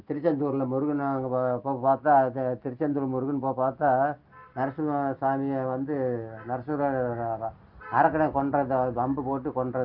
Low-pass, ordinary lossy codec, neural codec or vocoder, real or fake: 5.4 kHz; Opus, 32 kbps; none; real